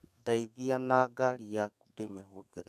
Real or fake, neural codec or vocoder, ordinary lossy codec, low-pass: fake; codec, 32 kHz, 1.9 kbps, SNAC; none; 14.4 kHz